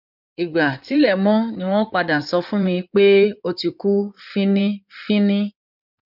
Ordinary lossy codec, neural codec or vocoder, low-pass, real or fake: none; codec, 16 kHz in and 24 kHz out, 2.2 kbps, FireRedTTS-2 codec; 5.4 kHz; fake